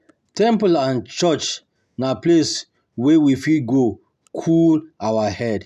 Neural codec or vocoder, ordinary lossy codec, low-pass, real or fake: none; none; 14.4 kHz; real